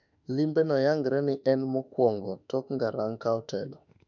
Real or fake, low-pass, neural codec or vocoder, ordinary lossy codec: fake; 7.2 kHz; autoencoder, 48 kHz, 32 numbers a frame, DAC-VAE, trained on Japanese speech; none